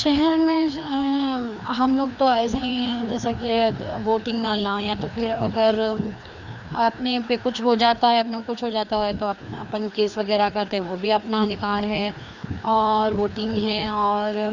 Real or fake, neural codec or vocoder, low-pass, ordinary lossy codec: fake; codec, 16 kHz, 2 kbps, FreqCodec, larger model; 7.2 kHz; none